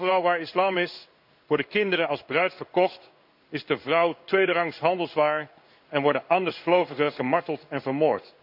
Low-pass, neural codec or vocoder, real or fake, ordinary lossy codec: 5.4 kHz; codec, 16 kHz in and 24 kHz out, 1 kbps, XY-Tokenizer; fake; none